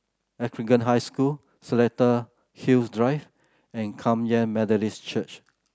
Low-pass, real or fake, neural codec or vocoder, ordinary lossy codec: none; real; none; none